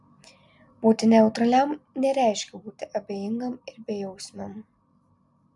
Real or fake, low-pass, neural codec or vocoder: real; 10.8 kHz; none